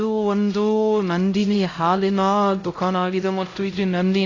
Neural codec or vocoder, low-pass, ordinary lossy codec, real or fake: codec, 16 kHz, 0.5 kbps, X-Codec, HuBERT features, trained on LibriSpeech; 7.2 kHz; AAC, 32 kbps; fake